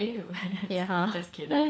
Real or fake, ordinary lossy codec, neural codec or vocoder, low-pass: fake; none; codec, 16 kHz, 4 kbps, FunCodec, trained on LibriTTS, 50 frames a second; none